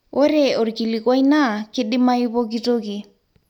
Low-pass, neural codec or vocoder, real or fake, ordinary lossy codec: 19.8 kHz; none; real; none